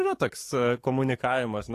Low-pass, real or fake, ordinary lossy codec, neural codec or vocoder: 14.4 kHz; fake; AAC, 48 kbps; codec, 44.1 kHz, 7.8 kbps, DAC